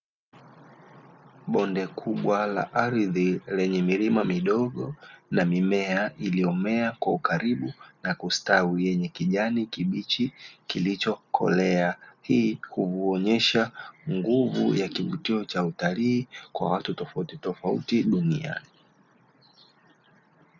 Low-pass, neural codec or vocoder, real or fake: 7.2 kHz; none; real